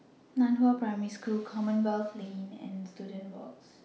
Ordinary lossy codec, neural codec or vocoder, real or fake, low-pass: none; none; real; none